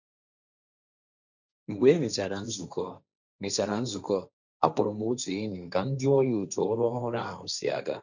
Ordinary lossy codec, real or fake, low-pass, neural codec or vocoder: none; fake; 7.2 kHz; codec, 16 kHz, 1.1 kbps, Voila-Tokenizer